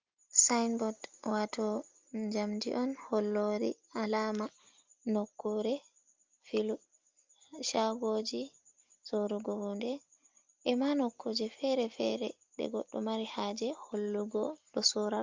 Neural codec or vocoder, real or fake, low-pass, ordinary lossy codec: none; real; 7.2 kHz; Opus, 32 kbps